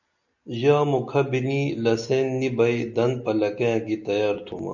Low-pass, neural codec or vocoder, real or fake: 7.2 kHz; none; real